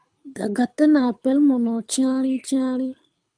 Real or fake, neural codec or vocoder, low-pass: fake; codec, 24 kHz, 6 kbps, HILCodec; 9.9 kHz